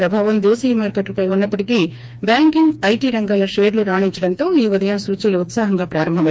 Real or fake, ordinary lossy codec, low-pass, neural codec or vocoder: fake; none; none; codec, 16 kHz, 2 kbps, FreqCodec, smaller model